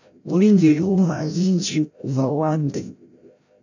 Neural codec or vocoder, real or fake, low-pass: codec, 16 kHz, 0.5 kbps, FreqCodec, larger model; fake; 7.2 kHz